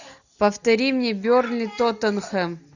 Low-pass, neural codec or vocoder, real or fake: 7.2 kHz; none; real